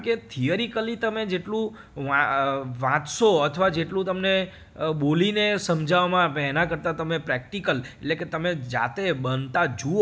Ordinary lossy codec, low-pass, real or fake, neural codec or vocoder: none; none; real; none